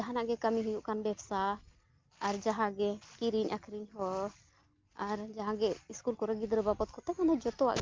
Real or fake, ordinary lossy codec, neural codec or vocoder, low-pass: real; Opus, 24 kbps; none; 7.2 kHz